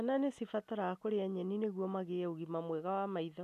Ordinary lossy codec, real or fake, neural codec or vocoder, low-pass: none; real; none; 14.4 kHz